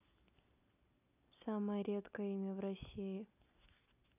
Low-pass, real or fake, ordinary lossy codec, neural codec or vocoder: 3.6 kHz; real; none; none